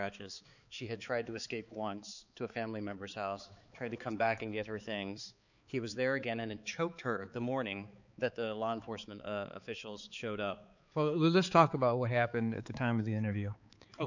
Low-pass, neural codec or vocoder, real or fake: 7.2 kHz; codec, 16 kHz, 4 kbps, X-Codec, HuBERT features, trained on balanced general audio; fake